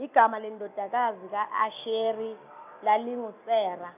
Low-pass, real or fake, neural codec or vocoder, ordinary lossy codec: 3.6 kHz; real; none; none